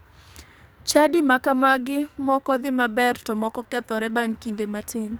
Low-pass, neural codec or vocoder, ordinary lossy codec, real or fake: none; codec, 44.1 kHz, 2.6 kbps, SNAC; none; fake